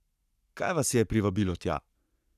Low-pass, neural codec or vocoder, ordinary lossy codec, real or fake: 14.4 kHz; codec, 44.1 kHz, 7.8 kbps, Pupu-Codec; none; fake